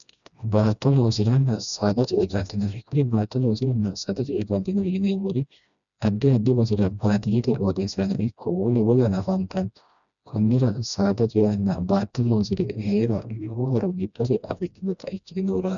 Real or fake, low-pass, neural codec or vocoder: fake; 7.2 kHz; codec, 16 kHz, 1 kbps, FreqCodec, smaller model